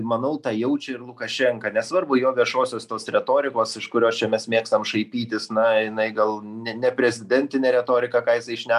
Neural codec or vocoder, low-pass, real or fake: none; 14.4 kHz; real